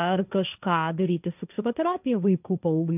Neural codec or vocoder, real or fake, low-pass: codec, 16 kHz, 1.1 kbps, Voila-Tokenizer; fake; 3.6 kHz